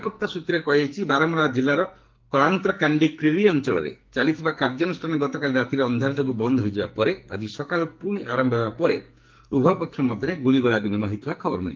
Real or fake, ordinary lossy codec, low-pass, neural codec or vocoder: fake; Opus, 32 kbps; 7.2 kHz; codec, 44.1 kHz, 2.6 kbps, SNAC